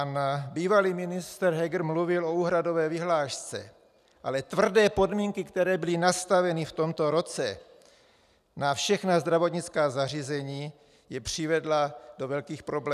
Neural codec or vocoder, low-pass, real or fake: none; 14.4 kHz; real